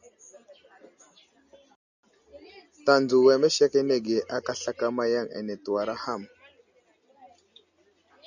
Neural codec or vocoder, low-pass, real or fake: none; 7.2 kHz; real